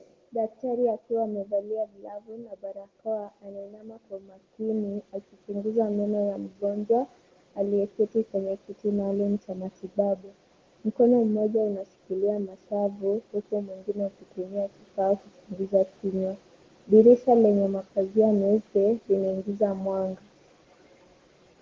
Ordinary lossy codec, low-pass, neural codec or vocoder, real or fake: Opus, 16 kbps; 7.2 kHz; none; real